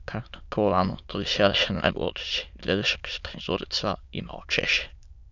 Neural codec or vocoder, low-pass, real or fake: autoencoder, 22.05 kHz, a latent of 192 numbers a frame, VITS, trained on many speakers; 7.2 kHz; fake